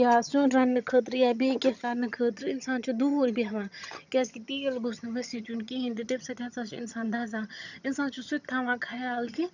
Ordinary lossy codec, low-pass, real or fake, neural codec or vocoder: none; 7.2 kHz; fake; vocoder, 22.05 kHz, 80 mel bands, HiFi-GAN